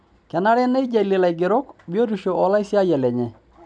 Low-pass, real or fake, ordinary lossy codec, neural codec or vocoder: 9.9 kHz; real; none; none